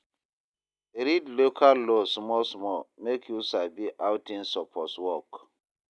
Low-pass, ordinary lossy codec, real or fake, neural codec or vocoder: none; none; real; none